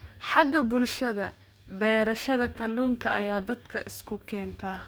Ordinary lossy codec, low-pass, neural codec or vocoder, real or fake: none; none; codec, 44.1 kHz, 2.6 kbps, DAC; fake